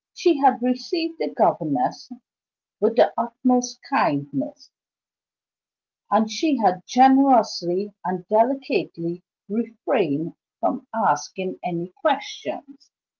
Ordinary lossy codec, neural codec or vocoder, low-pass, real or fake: Opus, 32 kbps; none; 7.2 kHz; real